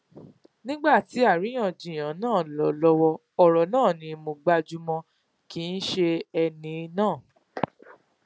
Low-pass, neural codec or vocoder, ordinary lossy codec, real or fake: none; none; none; real